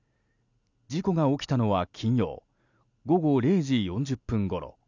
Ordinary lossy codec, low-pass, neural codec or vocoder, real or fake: none; 7.2 kHz; none; real